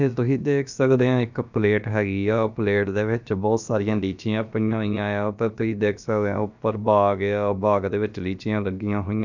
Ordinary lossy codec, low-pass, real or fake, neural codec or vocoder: none; 7.2 kHz; fake; codec, 16 kHz, about 1 kbps, DyCAST, with the encoder's durations